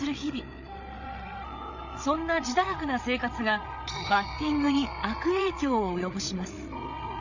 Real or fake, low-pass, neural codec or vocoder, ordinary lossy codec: fake; 7.2 kHz; codec, 16 kHz, 8 kbps, FreqCodec, larger model; none